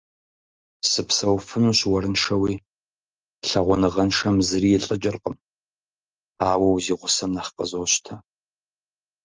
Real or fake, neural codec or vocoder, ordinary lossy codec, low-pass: real; none; Opus, 24 kbps; 7.2 kHz